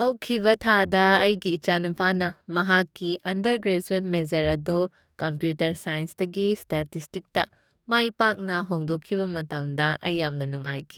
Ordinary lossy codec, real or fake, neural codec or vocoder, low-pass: none; fake; codec, 44.1 kHz, 2.6 kbps, DAC; 19.8 kHz